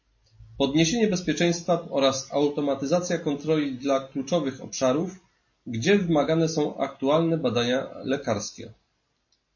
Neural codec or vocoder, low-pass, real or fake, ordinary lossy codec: none; 7.2 kHz; real; MP3, 32 kbps